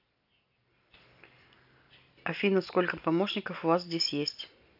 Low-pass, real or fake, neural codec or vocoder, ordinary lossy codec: 5.4 kHz; real; none; AAC, 48 kbps